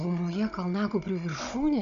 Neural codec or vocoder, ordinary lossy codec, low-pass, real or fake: codec, 16 kHz, 16 kbps, FunCodec, trained on Chinese and English, 50 frames a second; MP3, 64 kbps; 7.2 kHz; fake